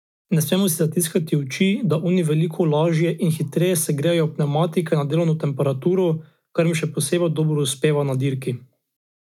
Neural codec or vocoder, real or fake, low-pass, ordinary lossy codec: none; real; 19.8 kHz; none